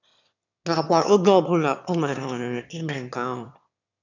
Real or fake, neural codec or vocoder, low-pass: fake; autoencoder, 22.05 kHz, a latent of 192 numbers a frame, VITS, trained on one speaker; 7.2 kHz